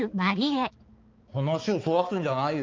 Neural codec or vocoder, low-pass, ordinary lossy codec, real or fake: codec, 16 kHz, 4 kbps, X-Codec, HuBERT features, trained on balanced general audio; 7.2 kHz; Opus, 32 kbps; fake